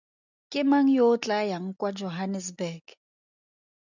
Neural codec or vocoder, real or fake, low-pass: none; real; 7.2 kHz